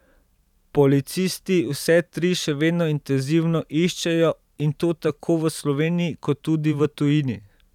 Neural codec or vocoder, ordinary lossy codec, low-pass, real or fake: vocoder, 44.1 kHz, 128 mel bands every 512 samples, BigVGAN v2; none; 19.8 kHz; fake